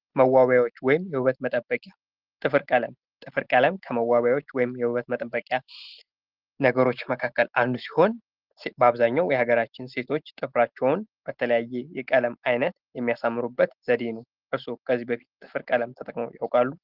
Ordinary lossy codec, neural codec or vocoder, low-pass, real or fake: Opus, 16 kbps; none; 5.4 kHz; real